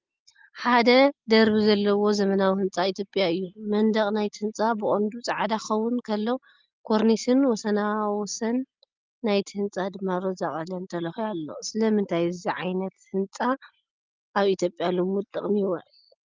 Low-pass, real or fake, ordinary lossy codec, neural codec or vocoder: 7.2 kHz; real; Opus, 32 kbps; none